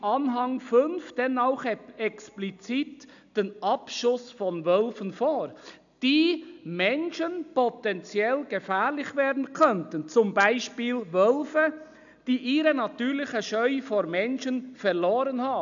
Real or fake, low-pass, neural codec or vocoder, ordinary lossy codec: real; 7.2 kHz; none; none